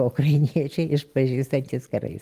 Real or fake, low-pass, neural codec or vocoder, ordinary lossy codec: real; 14.4 kHz; none; Opus, 32 kbps